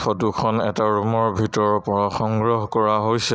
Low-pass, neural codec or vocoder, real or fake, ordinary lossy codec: none; none; real; none